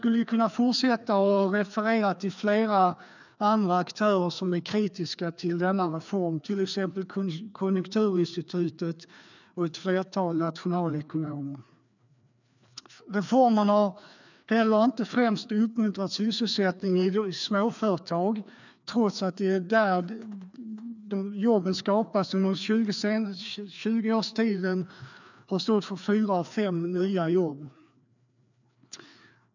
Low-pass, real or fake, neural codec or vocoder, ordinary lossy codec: 7.2 kHz; fake; codec, 16 kHz, 2 kbps, FreqCodec, larger model; none